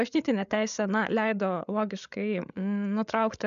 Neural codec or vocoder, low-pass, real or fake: codec, 16 kHz, 8 kbps, FreqCodec, larger model; 7.2 kHz; fake